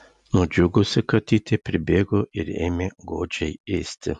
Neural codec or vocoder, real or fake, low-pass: none; real; 10.8 kHz